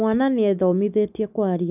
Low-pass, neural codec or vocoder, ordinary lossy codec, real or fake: 3.6 kHz; none; none; real